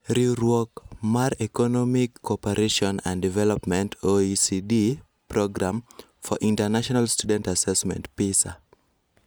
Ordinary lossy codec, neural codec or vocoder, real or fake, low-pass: none; none; real; none